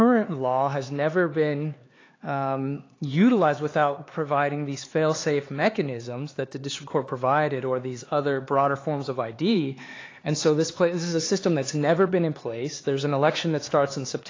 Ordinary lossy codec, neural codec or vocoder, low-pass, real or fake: AAC, 32 kbps; codec, 16 kHz, 4 kbps, X-Codec, HuBERT features, trained on LibriSpeech; 7.2 kHz; fake